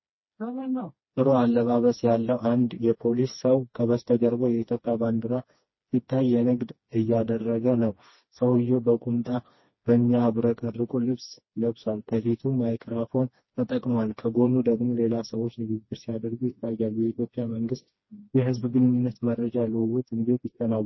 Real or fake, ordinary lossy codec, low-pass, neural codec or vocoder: fake; MP3, 24 kbps; 7.2 kHz; codec, 16 kHz, 2 kbps, FreqCodec, smaller model